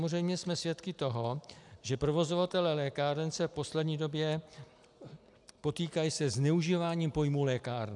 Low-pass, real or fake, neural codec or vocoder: 10.8 kHz; real; none